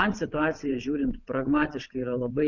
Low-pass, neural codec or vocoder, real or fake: 7.2 kHz; vocoder, 22.05 kHz, 80 mel bands, WaveNeXt; fake